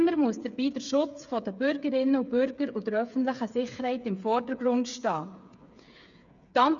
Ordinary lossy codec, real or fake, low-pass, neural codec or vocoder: none; fake; 7.2 kHz; codec, 16 kHz, 8 kbps, FreqCodec, smaller model